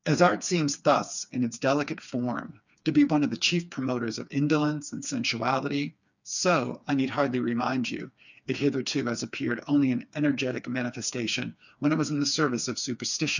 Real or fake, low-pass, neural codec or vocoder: fake; 7.2 kHz; codec, 16 kHz, 4 kbps, FreqCodec, smaller model